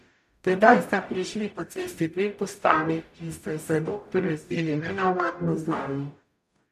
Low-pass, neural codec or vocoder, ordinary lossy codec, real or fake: 14.4 kHz; codec, 44.1 kHz, 0.9 kbps, DAC; none; fake